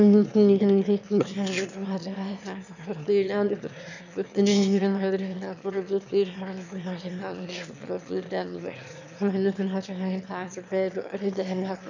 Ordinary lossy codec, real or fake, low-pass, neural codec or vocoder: none; fake; 7.2 kHz; autoencoder, 22.05 kHz, a latent of 192 numbers a frame, VITS, trained on one speaker